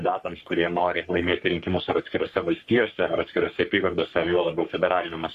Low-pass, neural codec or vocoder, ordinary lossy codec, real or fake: 14.4 kHz; codec, 44.1 kHz, 3.4 kbps, Pupu-Codec; Opus, 64 kbps; fake